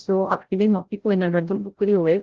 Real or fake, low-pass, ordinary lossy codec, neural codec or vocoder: fake; 7.2 kHz; Opus, 16 kbps; codec, 16 kHz, 0.5 kbps, FreqCodec, larger model